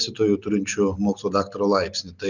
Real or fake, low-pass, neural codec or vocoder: real; 7.2 kHz; none